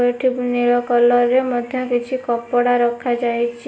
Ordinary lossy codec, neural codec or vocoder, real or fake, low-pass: none; none; real; none